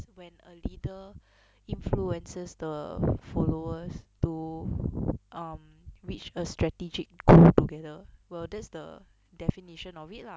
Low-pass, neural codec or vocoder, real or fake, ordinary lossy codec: none; none; real; none